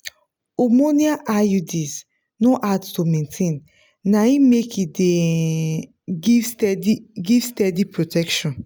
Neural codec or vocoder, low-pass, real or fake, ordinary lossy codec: none; none; real; none